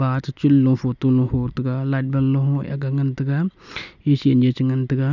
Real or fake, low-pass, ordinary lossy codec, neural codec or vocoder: real; 7.2 kHz; none; none